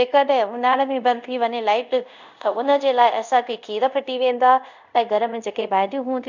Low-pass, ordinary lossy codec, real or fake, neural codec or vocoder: 7.2 kHz; none; fake; codec, 24 kHz, 0.5 kbps, DualCodec